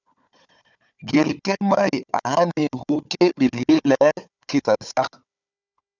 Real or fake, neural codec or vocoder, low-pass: fake; codec, 16 kHz, 4 kbps, FunCodec, trained on Chinese and English, 50 frames a second; 7.2 kHz